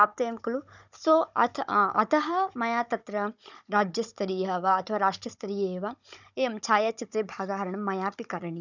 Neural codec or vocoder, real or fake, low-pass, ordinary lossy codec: codec, 16 kHz, 16 kbps, FunCodec, trained on Chinese and English, 50 frames a second; fake; 7.2 kHz; none